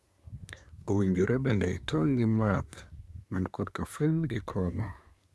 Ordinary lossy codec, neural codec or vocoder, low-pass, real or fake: none; codec, 24 kHz, 1 kbps, SNAC; none; fake